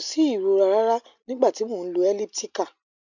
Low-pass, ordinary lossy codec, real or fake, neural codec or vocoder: 7.2 kHz; none; real; none